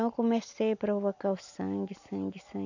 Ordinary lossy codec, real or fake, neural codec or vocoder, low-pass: none; real; none; 7.2 kHz